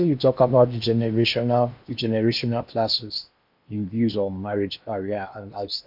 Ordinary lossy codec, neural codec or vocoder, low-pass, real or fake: MP3, 48 kbps; codec, 16 kHz in and 24 kHz out, 0.8 kbps, FocalCodec, streaming, 65536 codes; 5.4 kHz; fake